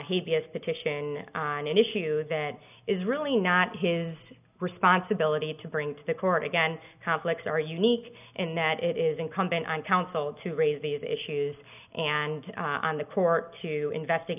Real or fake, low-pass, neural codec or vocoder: real; 3.6 kHz; none